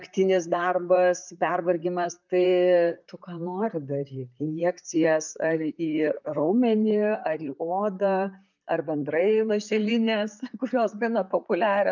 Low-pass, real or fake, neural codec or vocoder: 7.2 kHz; fake; vocoder, 44.1 kHz, 128 mel bands, Pupu-Vocoder